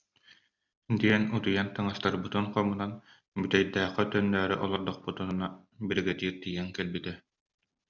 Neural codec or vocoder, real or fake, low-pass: none; real; 7.2 kHz